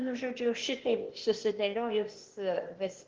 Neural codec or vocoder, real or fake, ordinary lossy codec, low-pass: codec, 16 kHz, 0.8 kbps, ZipCodec; fake; Opus, 16 kbps; 7.2 kHz